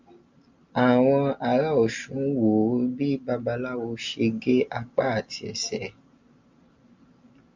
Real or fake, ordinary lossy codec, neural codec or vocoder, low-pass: real; MP3, 64 kbps; none; 7.2 kHz